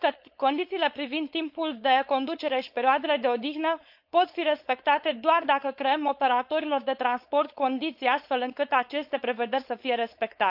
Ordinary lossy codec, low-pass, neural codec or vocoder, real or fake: AAC, 48 kbps; 5.4 kHz; codec, 16 kHz, 4.8 kbps, FACodec; fake